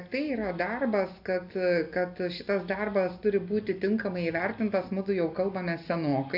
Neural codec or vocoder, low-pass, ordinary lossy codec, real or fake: none; 5.4 kHz; AAC, 48 kbps; real